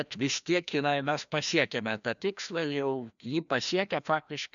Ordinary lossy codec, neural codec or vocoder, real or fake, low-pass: MP3, 96 kbps; codec, 16 kHz, 1 kbps, FreqCodec, larger model; fake; 7.2 kHz